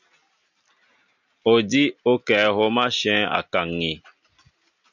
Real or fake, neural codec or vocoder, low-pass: real; none; 7.2 kHz